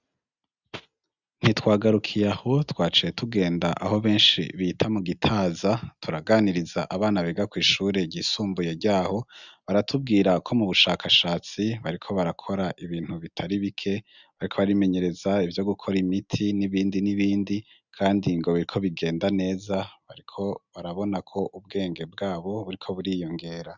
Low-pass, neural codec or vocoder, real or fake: 7.2 kHz; none; real